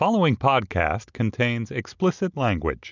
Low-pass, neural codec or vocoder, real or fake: 7.2 kHz; none; real